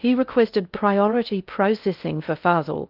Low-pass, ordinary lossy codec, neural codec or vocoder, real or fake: 5.4 kHz; Opus, 32 kbps; codec, 16 kHz in and 24 kHz out, 0.6 kbps, FocalCodec, streaming, 2048 codes; fake